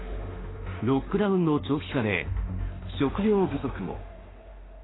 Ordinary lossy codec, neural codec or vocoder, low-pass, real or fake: AAC, 16 kbps; autoencoder, 48 kHz, 32 numbers a frame, DAC-VAE, trained on Japanese speech; 7.2 kHz; fake